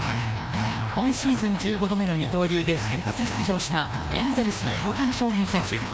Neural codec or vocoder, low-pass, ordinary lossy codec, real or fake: codec, 16 kHz, 1 kbps, FreqCodec, larger model; none; none; fake